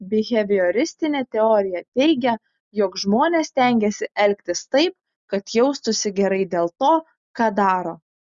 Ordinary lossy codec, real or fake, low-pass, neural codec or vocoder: Opus, 64 kbps; real; 7.2 kHz; none